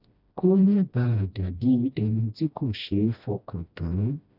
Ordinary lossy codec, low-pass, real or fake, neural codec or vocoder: none; 5.4 kHz; fake; codec, 16 kHz, 1 kbps, FreqCodec, smaller model